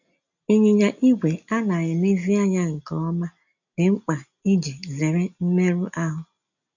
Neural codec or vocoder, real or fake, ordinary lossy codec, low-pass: none; real; none; 7.2 kHz